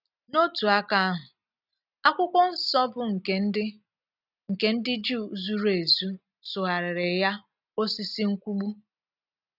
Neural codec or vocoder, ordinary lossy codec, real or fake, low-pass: none; none; real; 5.4 kHz